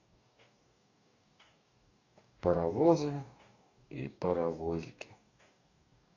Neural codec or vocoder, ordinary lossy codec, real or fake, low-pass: codec, 44.1 kHz, 2.6 kbps, DAC; none; fake; 7.2 kHz